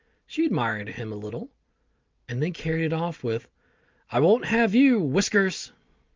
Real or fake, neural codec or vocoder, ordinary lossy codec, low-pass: real; none; Opus, 24 kbps; 7.2 kHz